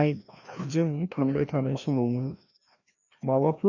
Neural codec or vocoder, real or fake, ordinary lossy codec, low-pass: codec, 16 kHz, 1 kbps, FreqCodec, larger model; fake; none; 7.2 kHz